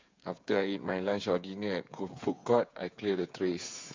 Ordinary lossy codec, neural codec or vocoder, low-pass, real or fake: MP3, 64 kbps; codec, 16 kHz, 8 kbps, FreqCodec, smaller model; 7.2 kHz; fake